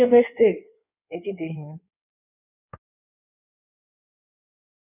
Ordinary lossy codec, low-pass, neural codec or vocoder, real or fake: none; 3.6 kHz; codec, 16 kHz in and 24 kHz out, 1.1 kbps, FireRedTTS-2 codec; fake